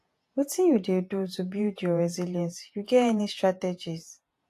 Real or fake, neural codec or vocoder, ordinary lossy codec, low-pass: fake; vocoder, 48 kHz, 128 mel bands, Vocos; AAC, 64 kbps; 14.4 kHz